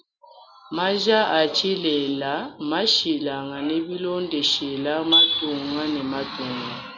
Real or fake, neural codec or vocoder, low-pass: real; none; 7.2 kHz